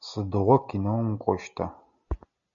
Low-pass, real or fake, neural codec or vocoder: 7.2 kHz; real; none